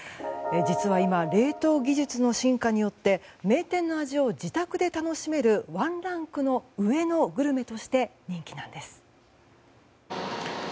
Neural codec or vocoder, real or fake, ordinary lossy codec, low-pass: none; real; none; none